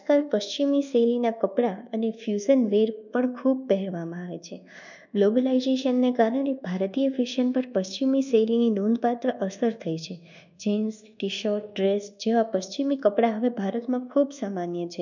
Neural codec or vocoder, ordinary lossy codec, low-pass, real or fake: codec, 24 kHz, 1.2 kbps, DualCodec; none; 7.2 kHz; fake